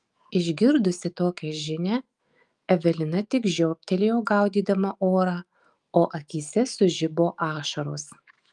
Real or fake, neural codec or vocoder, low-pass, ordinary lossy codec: fake; autoencoder, 48 kHz, 128 numbers a frame, DAC-VAE, trained on Japanese speech; 10.8 kHz; Opus, 32 kbps